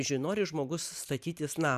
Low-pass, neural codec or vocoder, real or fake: 14.4 kHz; vocoder, 44.1 kHz, 128 mel bands every 512 samples, BigVGAN v2; fake